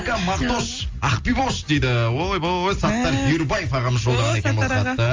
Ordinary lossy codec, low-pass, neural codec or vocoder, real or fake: Opus, 32 kbps; 7.2 kHz; none; real